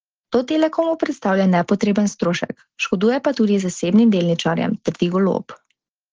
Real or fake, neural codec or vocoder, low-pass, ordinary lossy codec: real; none; 7.2 kHz; Opus, 16 kbps